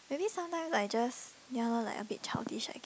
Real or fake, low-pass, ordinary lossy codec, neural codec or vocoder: real; none; none; none